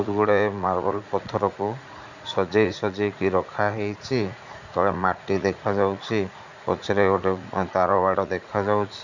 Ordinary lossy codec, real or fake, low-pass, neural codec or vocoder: none; fake; 7.2 kHz; vocoder, 44.1 kHz, 80 mel bands, Vocos